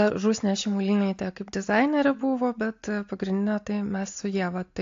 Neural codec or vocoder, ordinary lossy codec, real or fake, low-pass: none; AAC, 48 kbps; real; 7.2 kHz